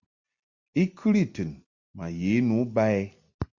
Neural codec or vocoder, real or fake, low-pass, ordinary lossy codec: none; real; 7.2 kHz; Opus, 64 kbps